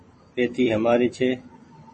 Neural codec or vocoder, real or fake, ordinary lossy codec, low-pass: vocoder, 44.1 kHz, 128 mel bands every 256 samples, BigVGAN v2; fake; MP3, 32 kbps; 10.8 kHz